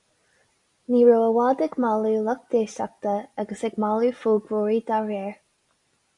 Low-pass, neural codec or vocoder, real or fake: 10.8 kHz; none; real